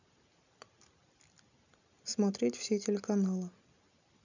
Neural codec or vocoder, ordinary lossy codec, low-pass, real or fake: none; none; 7.2 kHz; real